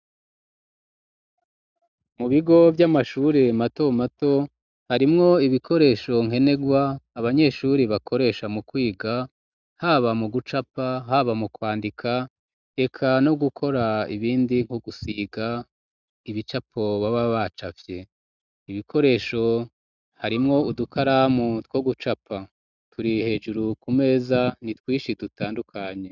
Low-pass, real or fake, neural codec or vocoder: 7.2 kHz; real; none